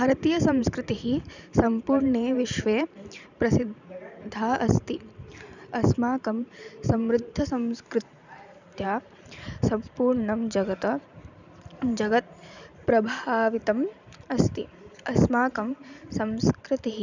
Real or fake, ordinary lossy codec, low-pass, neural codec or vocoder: fake; none; 7.2 kHz; vocoder, 44.1 kHz, 128 mel bands every 512 samples, BigVGAN v2